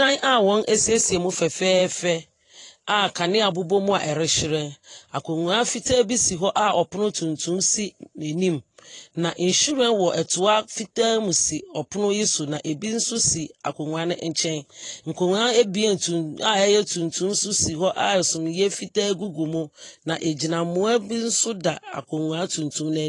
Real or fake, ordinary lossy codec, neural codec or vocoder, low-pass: fake; AAC, 32 kbps; vocoder, 44.1 kHz, 128 mel bands every 512 samples, BigVGAN v2; 10.8 kHz